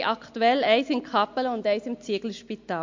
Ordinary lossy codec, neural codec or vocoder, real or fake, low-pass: MP3, 48 kbps; none; real; 7.2 kHz